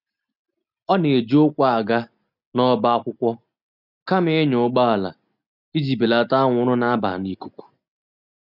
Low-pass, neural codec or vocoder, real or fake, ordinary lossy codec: 5.4 kHz; none; real; none